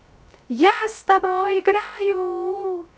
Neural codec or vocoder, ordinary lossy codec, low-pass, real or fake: codec, 16 kHz, 0.3 kbps, FocalCodec; none; none; fake